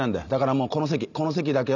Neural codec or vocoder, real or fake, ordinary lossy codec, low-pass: none; real; none; 7.2 kHz